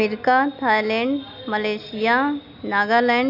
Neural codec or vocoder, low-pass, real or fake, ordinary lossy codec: none; 5.4 kHz; real; none